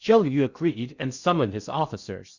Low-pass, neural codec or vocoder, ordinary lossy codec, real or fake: 7.2 kHz; codec, 16 kHz in and 24 kHz out, 0.6 kbps, FocalCodec, streaming, 2048 codes; Opus, 64 kbps; fake